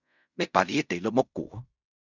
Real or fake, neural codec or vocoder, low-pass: fake; codec, 16 kHz in and 24 kHz out, 0.4 kbps, LongCat-Audio-Codec, fine tuned four codebook decoder; 7.2 kHz